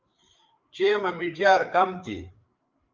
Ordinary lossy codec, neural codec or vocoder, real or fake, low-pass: Opus, 24 kbps; codec, 16 kHz, 4 kbps, FreqCodec, larger model; fake; 7.2 kHz